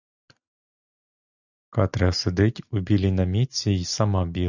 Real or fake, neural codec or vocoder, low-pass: real; none; 7.2 kHz